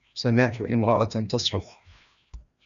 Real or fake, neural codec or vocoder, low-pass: fake; codec, 16 kHz, 1 kbps, FreqCodec, larger model; 7.2 kHz